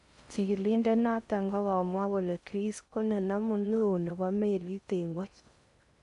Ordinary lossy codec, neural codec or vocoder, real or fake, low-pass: none; codec, 16 kHz in and 24 kHz out, 0.6 kbps, FocalCodec, streaming, 4096 codes; fake; 10.8 kHz